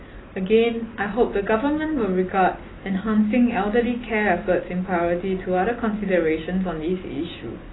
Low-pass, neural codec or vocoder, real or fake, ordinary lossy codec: 7.2 kHz; none; real; AAC, 16 kbps